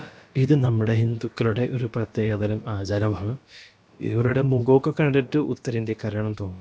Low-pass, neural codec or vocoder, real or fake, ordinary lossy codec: none; codec, 16 kHz, about 1 kbps, DyCAST, with the encoder's durations; fake; none